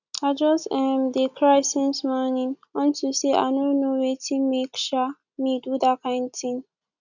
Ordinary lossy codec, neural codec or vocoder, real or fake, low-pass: none; none; real; 7.2 kHz